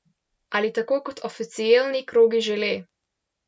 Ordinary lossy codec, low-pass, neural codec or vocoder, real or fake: none; none; none; real